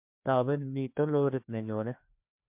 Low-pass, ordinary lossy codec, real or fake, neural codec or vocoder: 3.6 kHz; MP3, 32 kbps; fake; codec, 16 kHz, 2 kbps, FreqCodec, larger model